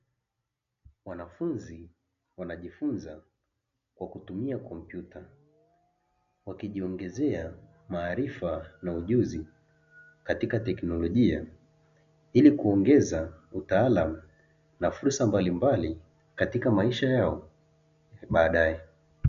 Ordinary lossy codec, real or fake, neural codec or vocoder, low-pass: MP3, 96 kbps; real; none; 7.2 kHz